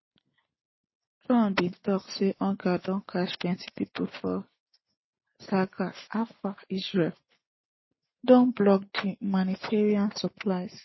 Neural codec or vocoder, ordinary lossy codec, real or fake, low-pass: none; MP3, 24 kbps; real; 7.2 kHz